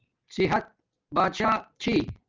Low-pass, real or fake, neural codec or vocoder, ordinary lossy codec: 7.2 kHz; real; none; Opus, 24 kbps